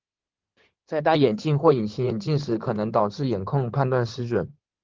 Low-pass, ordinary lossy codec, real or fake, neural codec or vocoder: 7.2 kHz; Opus, 16 kbps; fake; codec, 16 kHz in and 24 kHz out, 2.2 kbps, FireRedTTS-2 codec